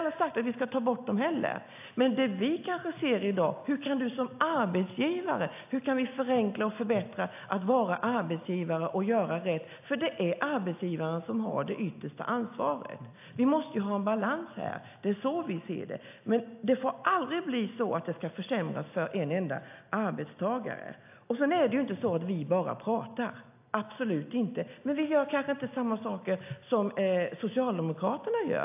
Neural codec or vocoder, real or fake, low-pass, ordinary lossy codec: none; real; 3.6 kHz; AAC, 32 kbps